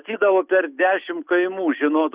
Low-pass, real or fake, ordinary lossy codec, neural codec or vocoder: 3.6 kHz; real; Opus, 64 kbps; none